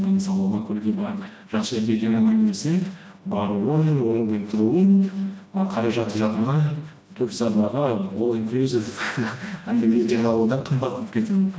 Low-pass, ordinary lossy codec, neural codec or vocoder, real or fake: none; none; codec, 16 kHz, 1 kbps, FreqCodec, smaller model; fake